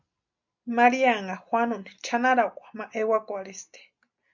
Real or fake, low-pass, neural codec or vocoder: real; 7.2 kHz; none